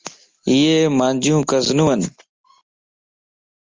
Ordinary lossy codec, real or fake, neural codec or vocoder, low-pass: Opus, 32 kbps; real; none; 7.2 kHz